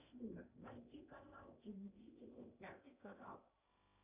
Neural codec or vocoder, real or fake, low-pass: codec, 16 kHz in and 24 kHz out, 0.8 kbps, FocalCodec, streaming, 65536 codes; fake; 3.6 kHz